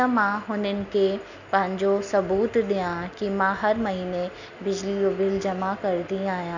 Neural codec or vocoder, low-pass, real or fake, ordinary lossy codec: none; 7.2 kHz; real; none